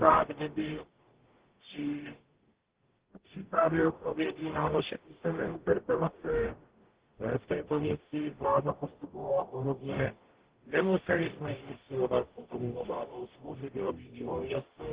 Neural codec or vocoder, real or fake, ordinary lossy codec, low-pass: codec, 44.1 kHz, 0.9 kbps, DAC; fake; Opus, 16 kbps; 3.6 kHz